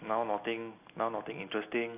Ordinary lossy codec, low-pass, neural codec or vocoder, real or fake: none; 3.6 kHz; none; real